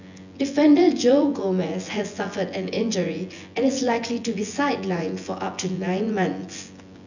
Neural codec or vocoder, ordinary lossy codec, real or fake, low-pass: vocoder, 24 kHz, 100 mel bands, Vocos; none; fake; 7.2 kHz